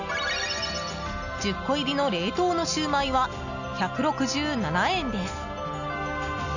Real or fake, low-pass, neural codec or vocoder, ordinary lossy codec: real; 7.2 kHz; none; none